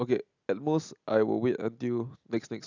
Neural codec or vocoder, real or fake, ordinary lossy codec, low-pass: none; real; none; 7.2 kHz